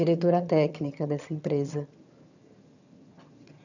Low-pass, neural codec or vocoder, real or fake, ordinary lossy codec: 7.2 kHz; vocoder, 22.05 kHz, 80 mel bands, HiFi-GAN; fake; none